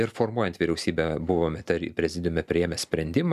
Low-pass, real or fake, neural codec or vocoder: 14.4 kHz; real; none